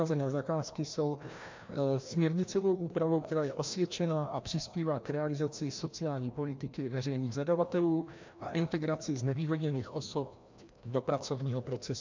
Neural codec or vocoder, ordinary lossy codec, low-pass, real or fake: codec, 16 kHz, 1 kbps, FreqCodec, larger model; MP3, 64 kbps; 7.2 kHz; fake